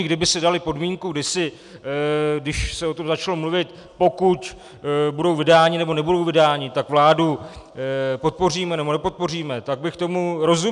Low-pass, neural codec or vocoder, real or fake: 10.8 kHz; none; real